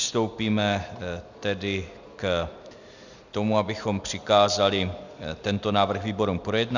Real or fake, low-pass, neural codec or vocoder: real; 7.2 kHz; none